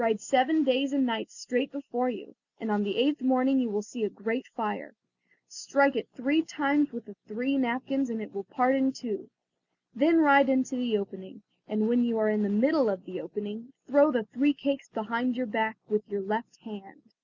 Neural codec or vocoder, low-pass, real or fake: none; 7.2 kHz; real